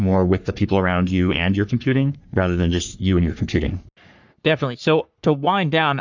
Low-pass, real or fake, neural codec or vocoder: 7.2 kHz; fake; codec, 44.1 kHz, 3.4 kbps, Pupu-Codec